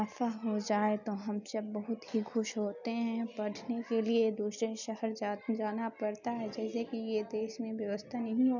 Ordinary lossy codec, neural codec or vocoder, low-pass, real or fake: none; none; 7.2 kHz; real